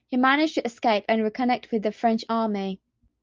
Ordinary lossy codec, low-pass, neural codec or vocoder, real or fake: Opus, 24 kbps; 7.2 kHz; none; real